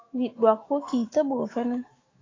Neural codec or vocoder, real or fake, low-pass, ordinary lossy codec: autoencoder, 48 kHz, 128 numbers a frame, DAC-VAE, trained on Japanese speech; fake; 7.2 kHz; AAC, 32 kbps